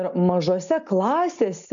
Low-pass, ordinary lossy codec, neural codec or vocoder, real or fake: 7.2 kHz; AAC, 64 kbps; none; real